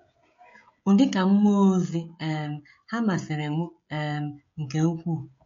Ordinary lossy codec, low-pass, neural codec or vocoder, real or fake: MP3, 48 kbps; 7.2 kHz; codec, 16 kHz, 16 kbps, FreqCodec, smaller model; fake